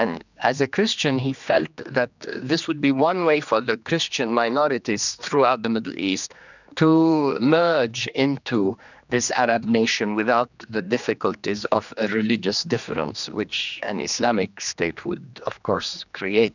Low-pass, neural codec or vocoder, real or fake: 7.2 kHz; codec, 16 kHz, 2 kbps, X-Codec, HuBERT features, trained on general audio; fake